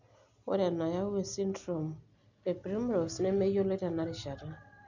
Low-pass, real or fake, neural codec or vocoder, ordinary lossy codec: 7.2 kHz; real; none; none